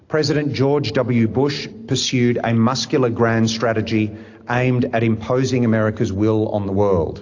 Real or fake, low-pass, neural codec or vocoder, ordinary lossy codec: real; 7.2 kHz; none; AAC, 48 kbps